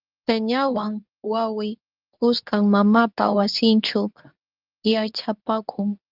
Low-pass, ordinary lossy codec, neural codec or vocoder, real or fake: 5.4 kHz; Opus, 24 kbps; codec, 24 kHz, 0.9 kbps, WavTokenizer, medium speech release version 2; fake